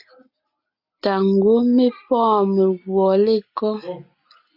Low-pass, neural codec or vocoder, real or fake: 5.4 kHz; none; real